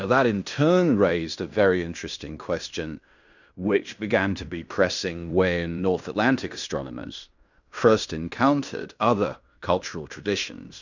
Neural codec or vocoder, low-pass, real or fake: codec, 16 kHz in and 24 kHz out, 0.9 kbps, LongCat-Audio-Codec, fine tuned four codebook decoder; 7.2 kHz; fake